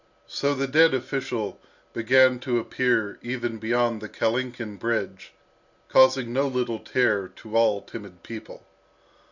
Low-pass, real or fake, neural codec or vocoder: 7.2 kHz; real; none